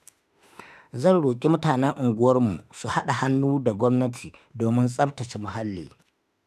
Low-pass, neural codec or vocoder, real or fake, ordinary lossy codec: 14.4 kHz; autoencoder, 48 kHz, 32 numbers a frame, DAC-VAE, trained on Japanese speech; fake; none